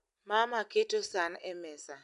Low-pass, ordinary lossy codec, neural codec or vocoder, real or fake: 10.8 kHz; MP3, 96 kbps; none; real